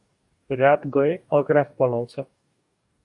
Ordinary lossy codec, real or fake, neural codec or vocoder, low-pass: AAC, 64 kbps; fake; codec, 44.1 kHz, 2.6 kbps, DAC; 10.8 kHz